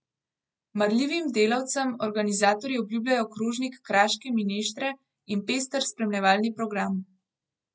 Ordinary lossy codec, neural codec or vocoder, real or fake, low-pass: none; none; real; none